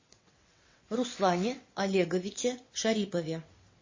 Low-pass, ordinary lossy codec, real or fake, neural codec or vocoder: 7.2 kHz; MP3, 32 kbps; fake; vocoder, 22.05 kHz, 80 mel bands, WaveNeXt